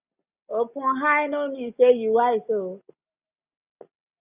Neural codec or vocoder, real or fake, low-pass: none; real; 3.6 kHz